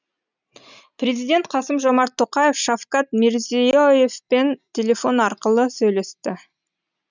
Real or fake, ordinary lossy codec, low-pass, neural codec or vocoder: real; none; 7.2 kHz; none